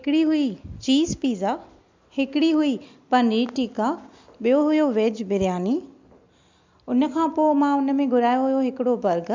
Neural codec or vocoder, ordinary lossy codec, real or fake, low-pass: none; MP3, 64 kbps; real; 7.2 kHz